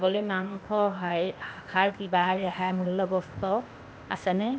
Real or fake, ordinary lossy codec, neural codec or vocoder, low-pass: fake; none; codec, 16 kHz, 0.8 kbps, ZipCodec; none